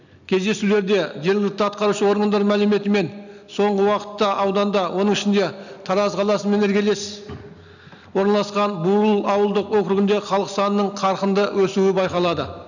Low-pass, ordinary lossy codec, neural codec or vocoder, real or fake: 7.2 kHz; none; none; real